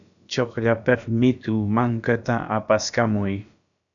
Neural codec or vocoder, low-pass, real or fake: codec, 16 kHz, about 1 kbps, DyCAST, with the encoder's durations; 7.2 kHz; fake